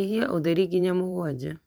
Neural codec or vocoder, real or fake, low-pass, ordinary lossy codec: vocoder, 44.1 kHz, 128 mel bands every 512 samples, BigVGAN v2; fake; none; none